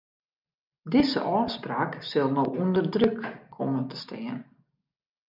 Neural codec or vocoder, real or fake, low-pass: none; real; 5.4 kHz